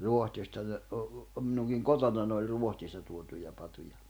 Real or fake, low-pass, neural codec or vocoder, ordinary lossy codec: real; none; none; none